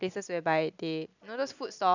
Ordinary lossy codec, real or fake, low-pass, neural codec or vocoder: none; real; 7.2 kHz; none